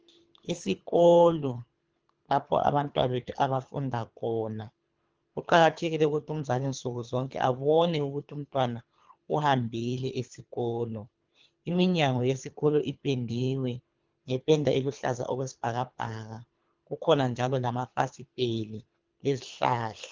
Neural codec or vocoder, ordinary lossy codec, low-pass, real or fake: codec, 24 kHz, 3 kbps, HILCodec; Opus, 24 kbps; 7.2 kHz; fake